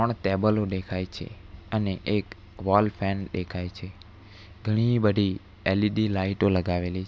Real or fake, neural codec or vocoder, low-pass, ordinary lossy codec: real; none; none; none